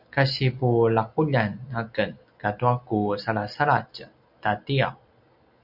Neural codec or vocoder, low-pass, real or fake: none; 5.4 kHz; real